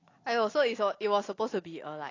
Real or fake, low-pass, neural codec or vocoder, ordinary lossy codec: real; 7.2 kHz; none; AAC, 32 kbps